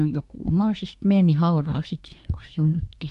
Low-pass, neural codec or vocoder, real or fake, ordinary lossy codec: 10.8 kHz; codec, 24 kHz, 1 kbps, SNAC; fake; none